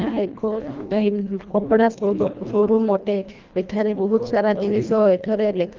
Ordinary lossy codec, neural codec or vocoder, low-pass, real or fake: Opus, 24 kbps; codec, 24 kHz, 1.5 kbps, HILCodec; 7.2 kHz; fake